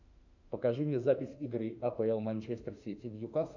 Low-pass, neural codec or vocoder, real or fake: 7.2 kHz; autoencoder, 48 kHz, 32 numbers a frame, DAC-VAE, trained on Japanese speech; fake